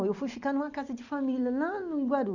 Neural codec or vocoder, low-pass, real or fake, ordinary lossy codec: none; 7.2 kHz; real; none